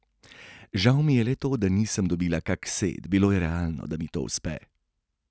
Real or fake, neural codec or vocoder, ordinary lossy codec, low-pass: real; none; none; none